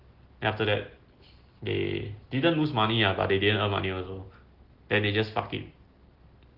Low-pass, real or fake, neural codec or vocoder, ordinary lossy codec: 5.4 kHz; real; none; Opus, 16 kbps